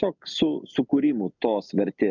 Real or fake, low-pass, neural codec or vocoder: real; 7.2 kHz; none